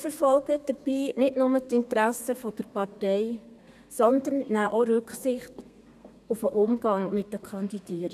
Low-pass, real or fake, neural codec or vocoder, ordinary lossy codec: 14.4 kHz; fake; codec, 32 kHz, 1.9 kbps, SNAC; none